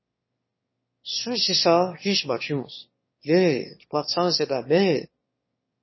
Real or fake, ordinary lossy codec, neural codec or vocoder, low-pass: fake; MP3, 24 kbps; autoencoder, 22.05 kHz, a latent of 192 numbers a frame, VITS, trained on one speaker; 7.2 kHz